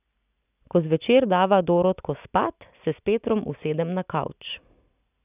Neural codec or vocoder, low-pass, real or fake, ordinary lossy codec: none; 3.6 kHz; real; none